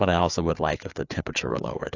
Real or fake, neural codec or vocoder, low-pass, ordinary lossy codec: fake; codec, 16 kHz, 4 kbps, FreqCodec, larger model; 7.2 kHz; AAC, 48 kbps